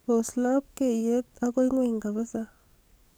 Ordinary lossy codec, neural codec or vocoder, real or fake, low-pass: none; codec, 44.1 kHz, 7.8 kbps, DAC; fake; none